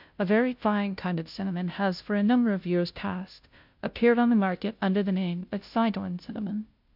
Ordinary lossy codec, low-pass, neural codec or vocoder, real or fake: MP3, 48 kbps; 5.4 kHz; codec, 16 kHz, 0.5 kbps, FunCodec, trained on Chinese and English, 25 frames a second; fake